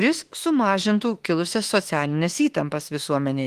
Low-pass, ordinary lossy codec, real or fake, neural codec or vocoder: 14.4 kHz; Opus, 24 kbps; fake; autoencoder, 48 kHz, 32 numbers a frame, DAC-VAE, trained on Japanese speech